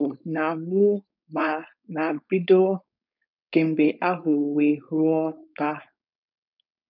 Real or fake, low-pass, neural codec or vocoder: fake; 5.4 kHz; codec, 16 kHz, 4.8 kbps, FACodec